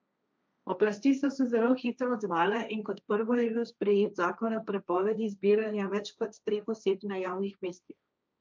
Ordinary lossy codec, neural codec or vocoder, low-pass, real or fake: none; codec, 16 kHz, 1.1 kbps, Voila-Tokenizer; none; fake